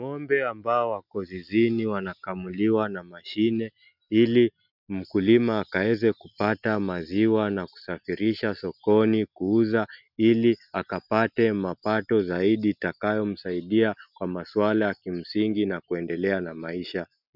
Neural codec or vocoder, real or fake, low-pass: none; real; 5.4 kHz